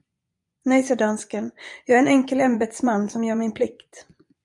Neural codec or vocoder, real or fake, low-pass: vocoder, 24 kHz, 100 mel bands, Vocos; fake; 10.8 kHz